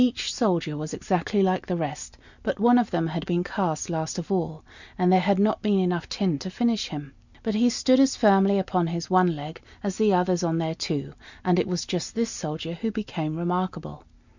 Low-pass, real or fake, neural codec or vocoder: 7.2 kHz; real; none